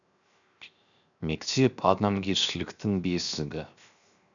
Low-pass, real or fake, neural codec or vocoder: 7.2 kHz; fake; codec, 16 kHz, 0.7 kbps, FocalCodec